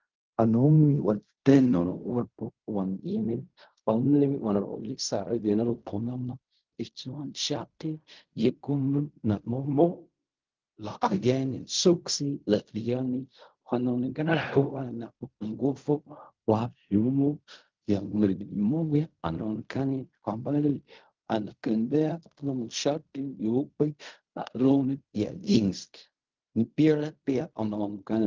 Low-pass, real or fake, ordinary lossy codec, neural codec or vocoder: 7.2 kHz; fake; Opus, 24 kbps; codec, 16 kHz in and 24 kHz out, 0.4 kbps, LongCat-Audio-Codec, fine tuned four codebook decoder